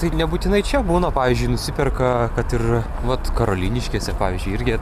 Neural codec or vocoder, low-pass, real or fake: none; 14.4 kHz; real